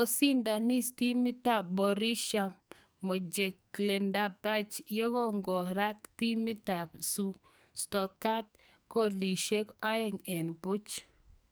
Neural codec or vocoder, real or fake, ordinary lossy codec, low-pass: codec, 44.1 kHz, 2.6 kbps, SNAC; fake; none; none